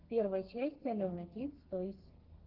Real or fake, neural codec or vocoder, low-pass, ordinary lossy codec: fake; codec, 32 kHz, 1.9 kbps, SNAC; 5.4 kHz; Opus, 16 kbps